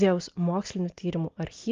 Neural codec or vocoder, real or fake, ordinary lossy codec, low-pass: none; real; Opus, 32 kbps; 7.2 kHz